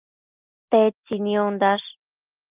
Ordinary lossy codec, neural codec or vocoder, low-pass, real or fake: Opus, 32 kbps; none; 3.6 kHz; real